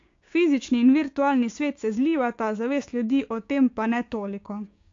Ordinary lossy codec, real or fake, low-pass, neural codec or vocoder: AAC, 48 kbps; fake; 7.2 kHz; codec, 16 kHz, 6 kbps, DAC